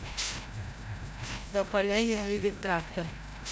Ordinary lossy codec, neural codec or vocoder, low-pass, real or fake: none; codec, 16 kHz, 0.5 kbps, FreqCodec, larger model; none; fake